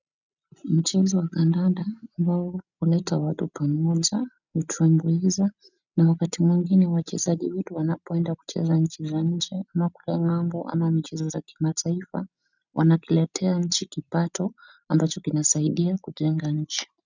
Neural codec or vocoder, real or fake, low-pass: none; real; 7.2 kHz